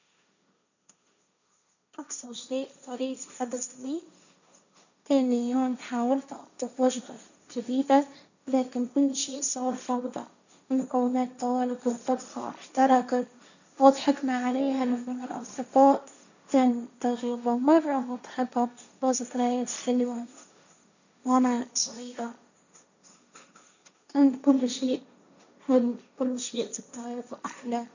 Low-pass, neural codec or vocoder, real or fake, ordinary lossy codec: 7.2 kHz; codec, 16 kHz, 1.1 kbps, Voila-Tokenizer; fake; none